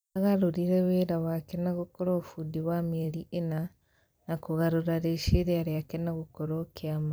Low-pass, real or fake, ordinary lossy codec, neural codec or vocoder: none; fake; none; vocoder, 44.1 kHz, 128 mel bands every 256 samples, BigVGAN v2